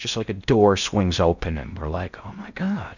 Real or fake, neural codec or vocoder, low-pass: fake; codec, 16 kHz in and 24 kHz out, 0.6 kbps, FocalCodec, streaming, 2048 codes; 7.2 kHz